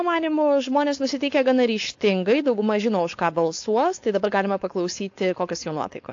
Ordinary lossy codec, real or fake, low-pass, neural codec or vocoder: AAC, 48 kbps; fake; 7.2 kHz; codec, 16 kHz, 4.8 kbps, FACodec